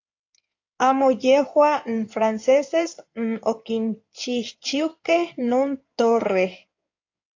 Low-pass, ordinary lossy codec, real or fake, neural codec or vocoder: 7.2 kHz; AAC, 48 kbps; fake; codec, 16 kHz in and 24 kHz out, 2.2 kbps, FireRedTTS-2 codec